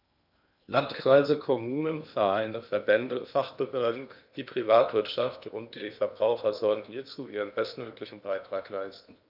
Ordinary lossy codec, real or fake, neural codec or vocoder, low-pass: none; fake; codec, 16 kHz in and 24 kHz out, 0.8 kbps, FocalCodec, streaming, 65536 codes; 5.4 kHz